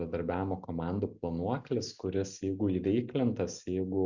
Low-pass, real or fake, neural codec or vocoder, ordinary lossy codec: 7.2 kHz; real; none; Opus, 64 kbps